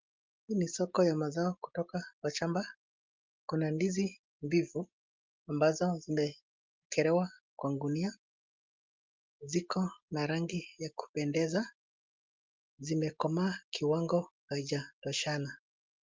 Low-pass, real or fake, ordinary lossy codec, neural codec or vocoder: 7.2 kHz; real; Opus, 24 kbps; none